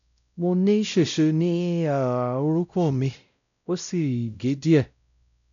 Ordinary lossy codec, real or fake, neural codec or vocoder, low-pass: none; fake; codec, 16 kHz, 0.5 kbps, X-Codec, WavLM features, trained on Multilingual LibriSpeech; 7.2 kHz